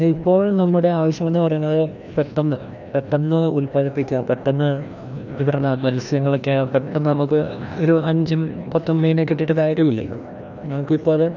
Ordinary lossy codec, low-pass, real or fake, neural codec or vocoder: none; 7.2 kHz; fake; codec, 16 kHz, 1 kbps, FreqCodec, larger model